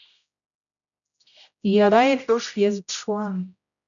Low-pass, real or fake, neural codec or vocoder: 7.2 kHz; fake; codec, 16 kHz, 0.5 kbps, X-Codec, HuBERT features, trained on general audio